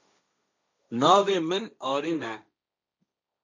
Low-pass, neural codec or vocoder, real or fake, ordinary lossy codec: 7.2 kHz; codec, 16 kHz, 1.1 kbps, Voila-Tokenizer; fake; MP3, 64 kbps